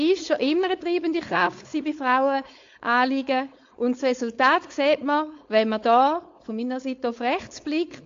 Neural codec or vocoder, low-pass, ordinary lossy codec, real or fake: codec, 16 kHz, 4.8 kbps, FACodec; 7.2 kHz; AAC, 48 kbps; fake